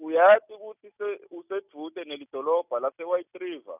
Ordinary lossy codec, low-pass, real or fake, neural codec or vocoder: none; 3.6 kHz; real; none